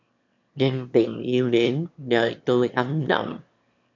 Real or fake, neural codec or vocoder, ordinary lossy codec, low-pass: fake; autoencoder, 22.05 kHz, a latent of 192 numbers a frame, VITS, trained on one speaker; MP3, 64 kbps; 7.2 kHz